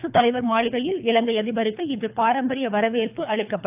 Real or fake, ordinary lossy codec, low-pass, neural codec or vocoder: fake; AAC, 32 kbps; 3.6 kHz; codec, 24 kHz, 3 kbps, HILCodec